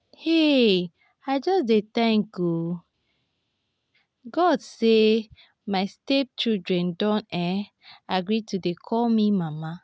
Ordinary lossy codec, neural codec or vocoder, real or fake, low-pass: none; none; real; none